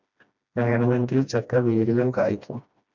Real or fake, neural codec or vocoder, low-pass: fake; codec, 16 kHz, 1 kbps, FreqCodec, smaller model; 7.2 kHz